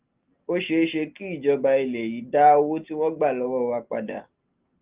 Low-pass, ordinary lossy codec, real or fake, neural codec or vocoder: 3.6 kHz; Opus, 24 kbps; real; none